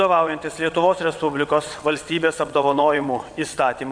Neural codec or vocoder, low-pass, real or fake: vocoder, 22.05 kHz, 80 mel bands, WaveNeXt; 9.9 kHz; fake